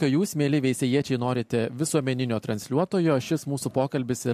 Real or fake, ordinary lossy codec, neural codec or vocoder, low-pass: real; MP3, 64 kbps; none; 14.4 kHz